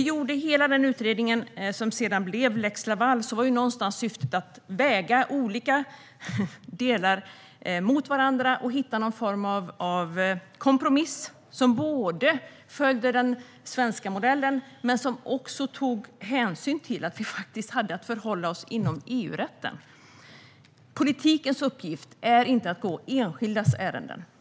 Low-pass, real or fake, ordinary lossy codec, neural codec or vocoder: none; real; none; none